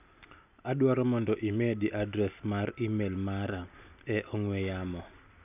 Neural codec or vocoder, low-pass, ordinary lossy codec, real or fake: none; 3.6 kHz; none; real